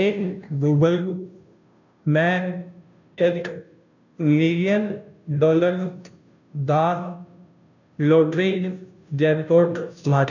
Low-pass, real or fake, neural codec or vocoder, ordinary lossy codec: 7.2 kHz; fake; codec, 16 kHz, 0.5 kbps, FunCodec, trained on Chinese and English, 25 frames a second; none